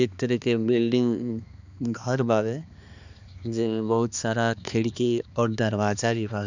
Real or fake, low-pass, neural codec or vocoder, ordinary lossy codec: fake; 7.2 kHz; codec, 16 kHz, 2 kbps, X-Codec, HuBERT features, trained on balanced general audio; none